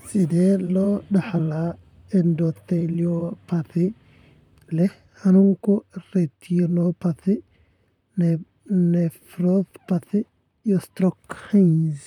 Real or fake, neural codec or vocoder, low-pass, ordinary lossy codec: fake; vocoder, 44.1 kHz, 128 mel bands, Pupu-Vocoder; 19.8 kHz; none